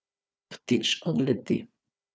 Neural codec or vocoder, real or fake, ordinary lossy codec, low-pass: codec, 16 kHz, 4 kbps, FunCodec, trained on Chinese and English, 50 frames a second; fake; none; none